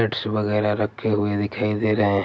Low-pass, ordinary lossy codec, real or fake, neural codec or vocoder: none; none; real; none